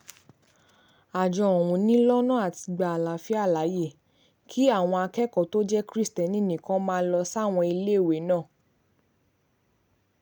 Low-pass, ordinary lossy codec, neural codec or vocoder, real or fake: 19.8 kHz; none; none; real